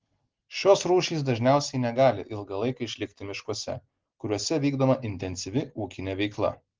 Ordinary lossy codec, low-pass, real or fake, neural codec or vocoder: Opus, 16 kbps; 7.2 kHz; real; none